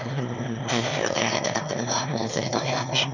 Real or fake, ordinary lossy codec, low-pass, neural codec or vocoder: fake; none; 7.2 kHz; autoencoder, 22.05 kHz, a latent of 192 numbers a frame, VITS, trained on one speaker